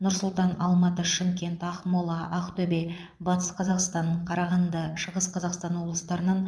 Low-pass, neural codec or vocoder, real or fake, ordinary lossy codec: none; vocoder, 22.05 kHz, 80 mel bands, Vocos; fake; none